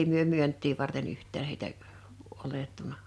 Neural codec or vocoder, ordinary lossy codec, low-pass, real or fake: none; none; none; real